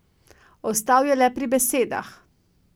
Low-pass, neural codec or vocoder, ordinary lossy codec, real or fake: none; none; none; real